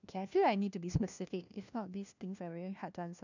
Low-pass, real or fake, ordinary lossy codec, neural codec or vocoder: 7.2 kHz; fake; none; codec, 16 kHz, 1 kbps, FunCodec, trained on LibriTTS, 50 frames a second